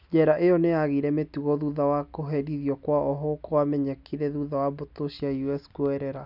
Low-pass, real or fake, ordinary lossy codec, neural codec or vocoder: 5.4 kHz; real; none; none